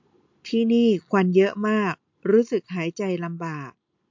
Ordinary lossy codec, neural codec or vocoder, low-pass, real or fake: MP3, 48 kbps; none; 7.2 kHz; real